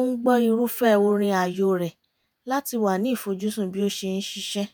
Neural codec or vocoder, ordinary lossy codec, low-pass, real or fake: vocoder, 48 kHz, 128 mel bands, Vocos; none; none; fake